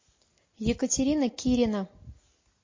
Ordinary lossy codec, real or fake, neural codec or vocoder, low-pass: MP3, 32 kbps; real; none; 7.2 kHz